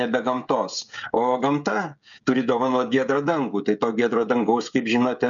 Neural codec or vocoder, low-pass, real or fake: codec, 16 kHz, 16 kbps, FreqCodec, smaller model; 7.2 kHz; fake